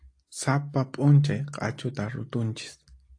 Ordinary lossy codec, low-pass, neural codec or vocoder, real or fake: AAC, 48 kbps; 9.9 kHz; none; real